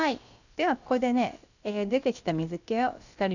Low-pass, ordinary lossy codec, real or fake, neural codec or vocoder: 7.2 kHz; none; fake; codec, 16 kHz, about 1 kbps, DyCAST, with the encoder's durations